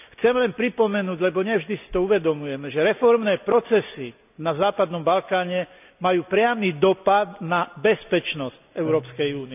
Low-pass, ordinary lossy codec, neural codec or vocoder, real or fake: 3.6 kHz; none; none; real